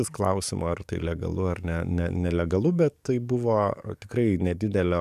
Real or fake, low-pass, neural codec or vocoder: fake; 14.4 kHz; codec, 44.1 kHz, 7.8 kbps, DAC